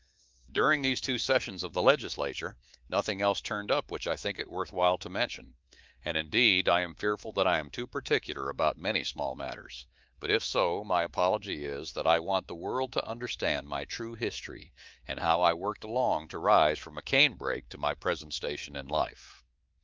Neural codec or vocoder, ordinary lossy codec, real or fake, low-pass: codec, 24 kHz, 3.1 kbps, DualCodec; Opus, 32 kbps; fake; 7.2 kHz